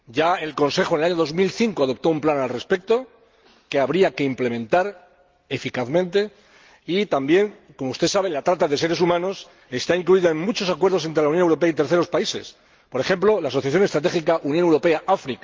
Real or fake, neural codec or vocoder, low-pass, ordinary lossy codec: real; none; 7.2 kHz; Opus, 24 kbps